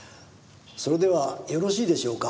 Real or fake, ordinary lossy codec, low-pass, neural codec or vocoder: real; none; none; none